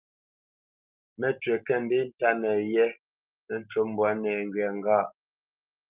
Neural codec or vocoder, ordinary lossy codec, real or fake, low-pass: none; Opus, 32 kbps; real; 3.6 kHz